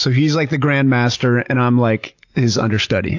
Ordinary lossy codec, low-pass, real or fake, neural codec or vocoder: AAC, 48 kbps; 7.2 kHz; real; none